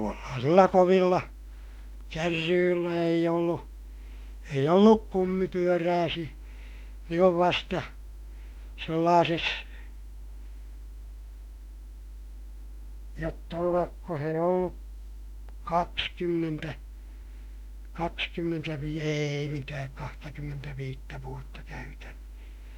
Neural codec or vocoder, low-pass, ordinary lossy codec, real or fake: autoencoder, 48 kHz, 32 numbers a frame, DAC-VAE, trained on Japanese speech; 19.8 kHz; none; fake